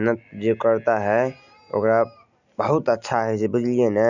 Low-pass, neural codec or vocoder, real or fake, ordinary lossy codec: 7.2 kHz; none; real; none